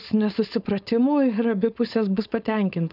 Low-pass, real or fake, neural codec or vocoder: 5.4 kHz; fake; codec, 16 kHz, 4.8 kbps, FACodec